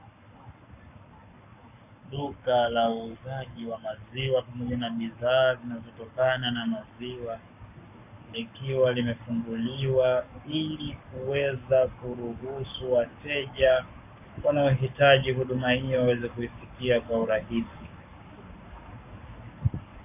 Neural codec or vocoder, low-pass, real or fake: codec, 44.1 kHz, 7.8 kbps, Pupu-Codec; 3.6 kHz; fake